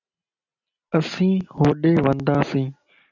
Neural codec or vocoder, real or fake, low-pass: none; real; 7.2 kHz